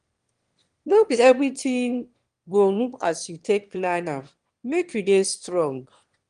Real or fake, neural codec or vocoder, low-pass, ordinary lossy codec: fake; autoencoder, 22.05 kHz, a latent of 192 numbers a frame, VITS, trained on one speaker; 9.9 kHz; Opus, 24 kbps